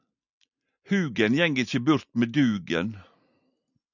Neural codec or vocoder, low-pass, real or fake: none; 7.2 kHz; real